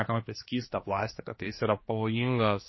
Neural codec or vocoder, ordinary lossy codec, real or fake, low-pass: codec, 16 kHz, 2 kbps, X-Codec, HuBERT features, trained on general audio; MP3, 24 kbps; fake; 7.2 kHz